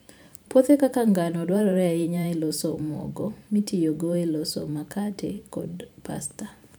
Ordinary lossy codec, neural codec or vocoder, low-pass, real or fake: none; vocoder, 44.1 kHz, 128 mel bands every 512 samples, BigVGAN v2; none; fake